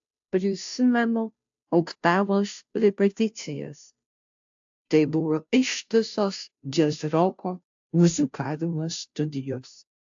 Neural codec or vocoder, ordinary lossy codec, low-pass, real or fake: codec, 16 kHz, 0.5 kbps, FunCodec, trained on Chinese and English, 25 frames a second; AAC, 64 kbps; 7.2 kHz; fake